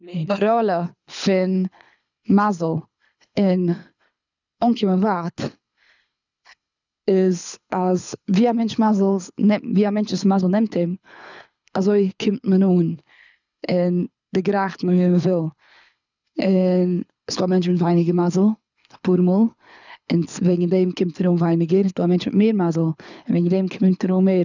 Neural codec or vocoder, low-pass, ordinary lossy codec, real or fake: codec, 24 kHz, 6 kbps, HILCodec; 7.2 kHz; none; fake